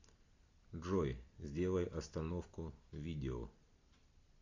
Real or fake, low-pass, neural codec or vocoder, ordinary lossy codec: real; 7.2 kHz; none; AAC, 48 kbps